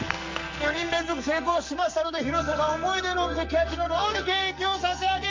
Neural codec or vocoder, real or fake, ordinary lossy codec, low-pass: codec, 44.1 kHz, 2.6 kbps, SNAC; fake; MP3, 64 kbps; 7.2 kHz